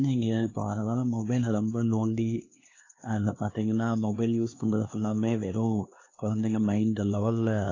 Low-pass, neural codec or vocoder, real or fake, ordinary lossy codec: 7.2 kHz; codec, 16 kHz, 2 kbps, X-Codec, HuBERT features, trained on LibriSpeech; fake; AAC, 32 kbps